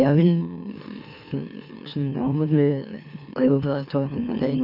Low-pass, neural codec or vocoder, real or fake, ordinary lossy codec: 5.4 kHz; autoencoder, 44.1 kHz, a latent of 192 numbers a frame, MeloTTS; fake; none